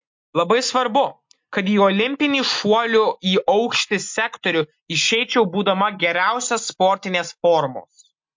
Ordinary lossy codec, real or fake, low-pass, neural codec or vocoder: MP3, 48 kbps; real; 7.2 kHz; none